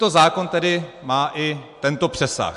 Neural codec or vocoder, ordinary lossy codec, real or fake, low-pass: none; MP3, 64 kbps; real; 10.8 kHz